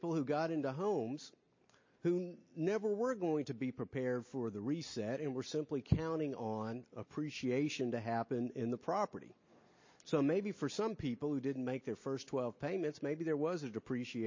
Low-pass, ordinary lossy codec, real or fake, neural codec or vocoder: 7.2 kHz; MP3, 32 kbps; real; none